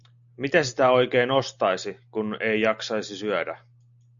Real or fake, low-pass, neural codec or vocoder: real; 7.2 kHz; none